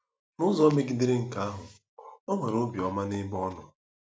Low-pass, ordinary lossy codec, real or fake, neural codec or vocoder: none; none; real; none